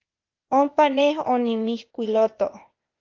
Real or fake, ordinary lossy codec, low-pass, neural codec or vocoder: fake; Opus, 32 kbps; 7.2 kHz; codec, 16 kHz, 0.8 kbps, ZipCodec